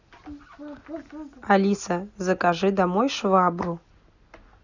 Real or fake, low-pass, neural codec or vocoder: real; 7.2 kHz; none